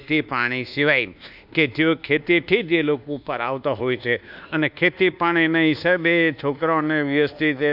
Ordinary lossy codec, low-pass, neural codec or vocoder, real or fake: none; 5.4 kHz; codec, 24 kHz, 1.2 kbps, DualCodec; fake